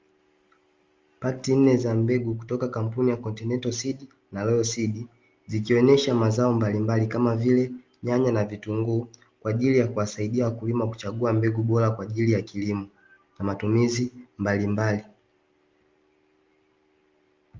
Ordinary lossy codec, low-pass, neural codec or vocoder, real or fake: Opus, 32 kbps; 7.2 kHz; none; real